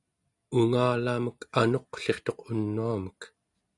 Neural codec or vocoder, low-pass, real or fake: none; 10.8 kHz; real